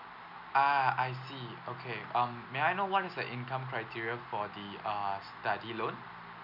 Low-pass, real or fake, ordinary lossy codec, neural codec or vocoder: 5.4 kHz; real; none; none